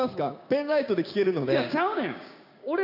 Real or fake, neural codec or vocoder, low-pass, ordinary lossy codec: fake; codec, 16 kHz, 6 kbps, DAC; 5.4 kHz; none